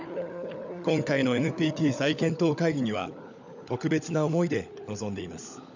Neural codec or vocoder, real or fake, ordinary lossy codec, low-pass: codec, 16 kHz, 16 kbps, FunCodec, trained on LibriTTS, 50 frames a second; fake; none; 7.2 kHz